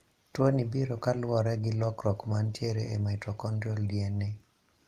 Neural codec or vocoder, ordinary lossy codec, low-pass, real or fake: none; Opus, 32 kbps; 14.4 kHz; real